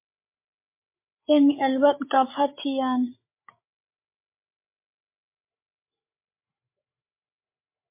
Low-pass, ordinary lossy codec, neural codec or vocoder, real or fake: 3.6 kHz; MP3, 24 kbps; codec, 16 kHz, 8 kbps, FreqCodec, larger model; fake